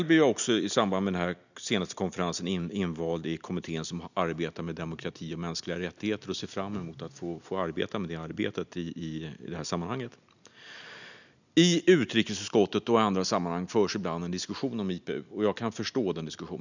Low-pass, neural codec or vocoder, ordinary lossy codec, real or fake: 7.2 kHz; none; none; real